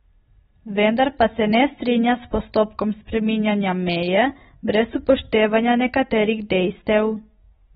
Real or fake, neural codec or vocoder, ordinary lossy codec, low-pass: real; none; AAC, 16 kbps; 9.9 kHz